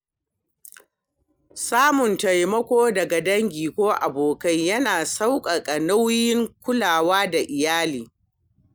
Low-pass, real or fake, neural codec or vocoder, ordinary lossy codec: none; real; none; none